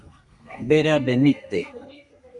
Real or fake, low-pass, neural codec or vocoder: fake; 10.8 kHz; codec, 32 kHz, 1.9 kbps, SNAC